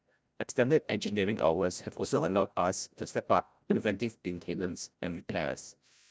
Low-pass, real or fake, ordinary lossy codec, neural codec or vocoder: none; fake; none; codec, 16 kHz, 0.5 kbps, FreqCodec, larger model